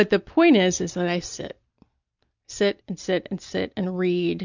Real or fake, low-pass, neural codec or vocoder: real; 7.2 kHz; none